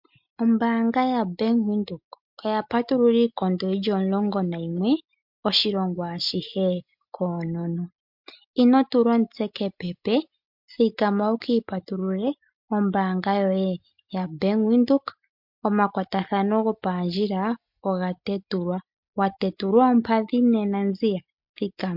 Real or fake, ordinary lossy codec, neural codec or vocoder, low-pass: real; MP3, 48 kbps; none; 5.4 kHz